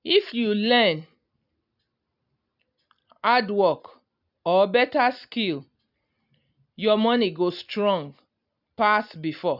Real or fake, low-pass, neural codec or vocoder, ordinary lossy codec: real; 5.4 kHz; none; none